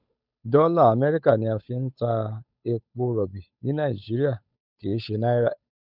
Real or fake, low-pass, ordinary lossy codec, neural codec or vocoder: fake; 5.4 kHz; none; codec, 16 kHz, 8 kbps, FunCodec, trained on Chinese and English, 25 frames a second